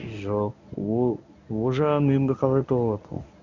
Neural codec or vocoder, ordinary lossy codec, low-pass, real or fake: codec, 24 kHz, 0.9 kbps, WavTokenizer, medium speech release version 1; none; 7.2 kHz; fake